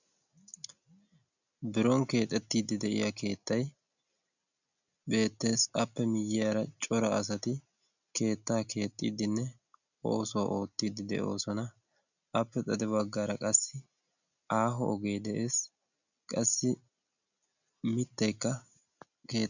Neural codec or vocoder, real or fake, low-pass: none; real; 7.2 kHz